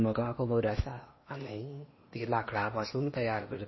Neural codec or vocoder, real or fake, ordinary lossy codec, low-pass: codec, 16 kHz in and 24 kHz out, 0.8 kbps, FocalCodec, streaming, 65536 codes; fake; MP3, 24 kbps; 7.2 kHz